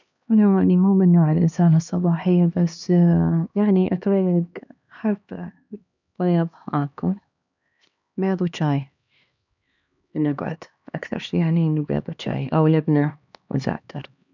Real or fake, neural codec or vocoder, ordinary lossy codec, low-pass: fake; codec, 16 kHz, 2 kbps, X-Codec, HuBERT features, trained on LibriSpeech; none; 7.2 kHz